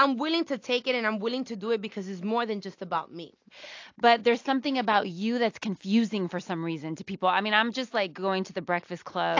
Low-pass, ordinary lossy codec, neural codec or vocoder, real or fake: 7.2 kHz; AAC, 48 kbps; none; real